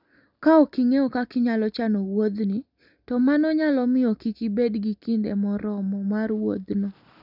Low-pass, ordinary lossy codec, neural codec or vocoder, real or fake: 5.4 kHz; none; none; real